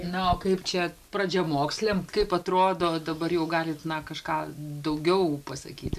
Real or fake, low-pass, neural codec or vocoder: real; 14.4 kHz; none